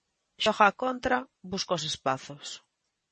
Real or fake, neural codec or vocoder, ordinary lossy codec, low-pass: fake; vocoder, 44.1 kHz, 128 mel bands every 256 samples, BigVGAN v2; MP3, 32 kbps; 10.8 kHz